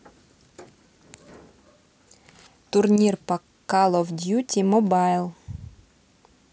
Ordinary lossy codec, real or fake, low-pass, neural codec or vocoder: none; real; none; none